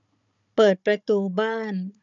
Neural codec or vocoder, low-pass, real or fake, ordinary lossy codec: codec, 16 kHz, 16 kbps, FunCodec, trained on Chinese and English, 50 frames a second; 7.2 kHz; fake; none